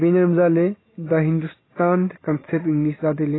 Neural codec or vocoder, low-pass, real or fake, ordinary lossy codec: none; 7.2 kHz; real; AAC, 16 kbps